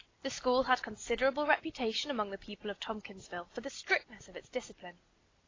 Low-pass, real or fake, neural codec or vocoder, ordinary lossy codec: 7.2 kHz; real; none; AAC, 32 kbps